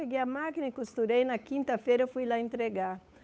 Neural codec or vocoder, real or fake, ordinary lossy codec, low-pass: codec, 16 kHz, 8 kbps, FunCodec, trained on Chinese and English, 25 frames a second; fake; none; none